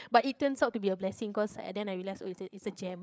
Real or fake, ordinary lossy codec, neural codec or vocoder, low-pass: fake; none; codec, 16 kHz, 8 kbps, FreqCodec, larger model; none